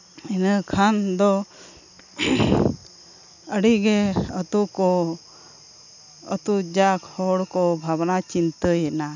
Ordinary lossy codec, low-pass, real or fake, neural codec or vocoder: none; 7.2 kHz; real; none